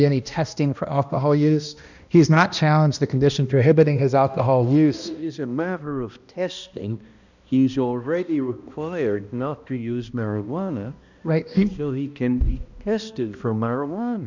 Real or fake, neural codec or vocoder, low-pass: fake; codec, 16 kHz, 1 kbps, X-Codec, HuBERT features, trained on balanced general audio; 7.2 kHz